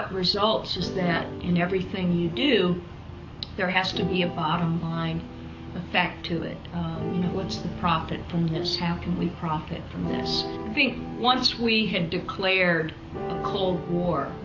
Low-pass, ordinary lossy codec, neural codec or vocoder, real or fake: 7.2 kHz; AAC, 48 kbps; codec, 44.1 kHz, 7.8 kbps, DAC; fake